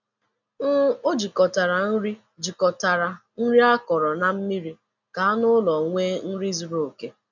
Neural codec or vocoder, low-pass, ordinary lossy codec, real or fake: none; 7.2 kHz; none; real